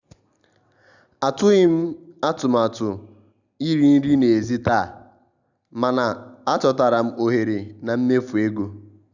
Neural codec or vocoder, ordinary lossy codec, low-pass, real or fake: none; none; 7.2 kHz; real